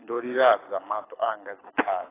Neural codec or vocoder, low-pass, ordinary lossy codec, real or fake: codec, 24 kHz, 6 kbps, HILCodec; 3.6 kHz; AAC, 16 kbps; fake